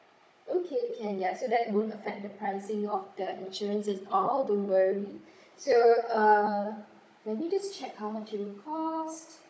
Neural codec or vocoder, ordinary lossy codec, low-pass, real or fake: codec, 16 kHz, 16 kbps, FunCodec, trained on Chinese and English, 50 frames a second; none; none; fake